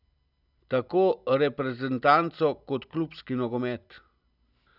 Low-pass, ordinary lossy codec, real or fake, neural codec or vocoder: 5.4 kHz; none; real; none